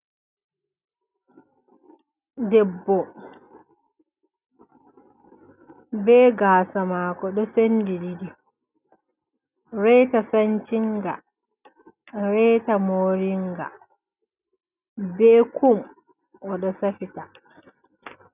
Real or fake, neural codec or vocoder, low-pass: real; none; 3.6 kHz